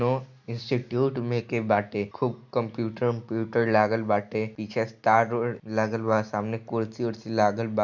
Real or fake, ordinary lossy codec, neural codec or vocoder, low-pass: real; none; none; 7.2 kHz